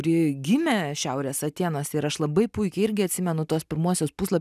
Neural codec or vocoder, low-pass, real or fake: none; 14.4 kHz; real